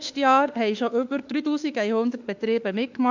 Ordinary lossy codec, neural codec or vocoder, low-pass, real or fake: none; autoencoder, 48 kHz, 32 numbers a frame, DAC-VAE, trained on Japanese speech; 7.2 kHz; fake